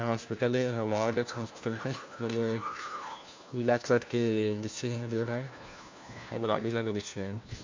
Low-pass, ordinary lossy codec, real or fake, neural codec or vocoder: 7.2 kHz; MP3, 64 kbps; fake; codec, 16 kHz, 1 kbps, FunCodec, trained on LibriTTS, 50 frames a second